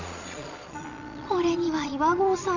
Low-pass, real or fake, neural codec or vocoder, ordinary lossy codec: 7.2 kHz; fake; vocoder, 22.05 kHz, 80 mel bands, Vocos; none